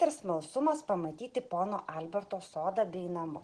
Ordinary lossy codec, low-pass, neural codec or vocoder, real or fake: Opus, 16 kbps; 9.9 kHz; none; real